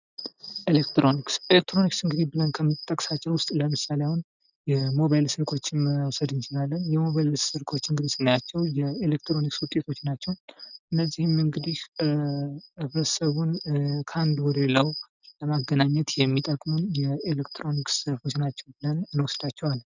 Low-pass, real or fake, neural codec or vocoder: 7.2 kHz; real; none